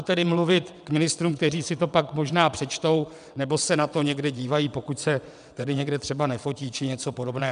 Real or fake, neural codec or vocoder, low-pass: fake; vocoder, 22.05 kHz, 80 mel bands, WaveNeXt; 9.9 kHz